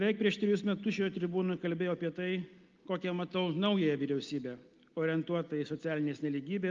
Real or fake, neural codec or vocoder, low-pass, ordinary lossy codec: real; none; 7.2 kHz; Opus, 32 kbps